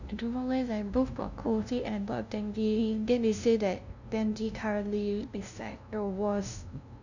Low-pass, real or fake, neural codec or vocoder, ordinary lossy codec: 7.2 kHz; fake; codec, 16 kHz, 0.5 kbps, FunCodec, trained on LibriTTS, 25 frames a second; none